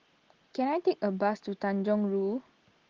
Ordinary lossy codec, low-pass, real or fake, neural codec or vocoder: Opus, 16 kbps; 7.2 kHz; real; none